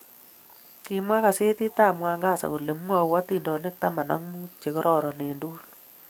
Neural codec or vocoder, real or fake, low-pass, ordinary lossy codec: codec, 44.1 kHz, 7.8 kbps, DAC; fake; none; none